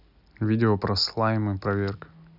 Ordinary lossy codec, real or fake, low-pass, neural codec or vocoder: none; real; 5.4 kHz; none